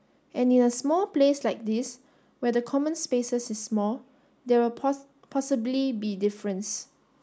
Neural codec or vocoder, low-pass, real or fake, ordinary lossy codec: none; none; real; none